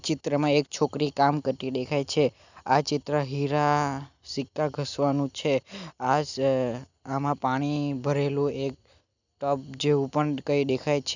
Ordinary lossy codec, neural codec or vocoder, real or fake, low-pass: none; none; real; 7.2 kHz